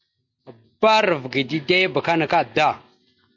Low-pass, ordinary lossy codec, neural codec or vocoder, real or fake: 7.2 kHz; MP3, 48 kbps; none; real